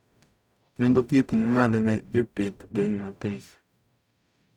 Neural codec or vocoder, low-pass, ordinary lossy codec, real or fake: codec, 44.1 kHz, 0.9 kbps, DAC; 19.8 kHz; none; fake